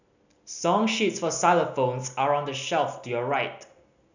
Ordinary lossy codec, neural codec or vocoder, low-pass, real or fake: none; none; 7.2 kHz; real